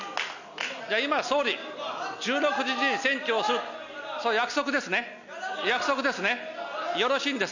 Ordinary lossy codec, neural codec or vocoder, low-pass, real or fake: none; none; 7.2 kHz; real